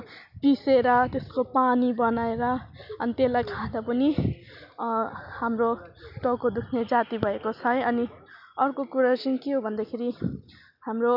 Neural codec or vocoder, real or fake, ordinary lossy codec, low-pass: none; real; none; 5.4 kHz